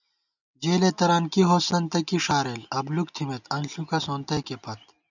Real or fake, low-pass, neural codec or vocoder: real; 7.2 kHz; none